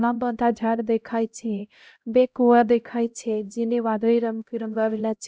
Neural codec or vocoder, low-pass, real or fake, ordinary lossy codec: codec, 16 kHz, 0.5 kbps, X-Codec, HuBERT features, trained on LibriSpeech; none; fake; none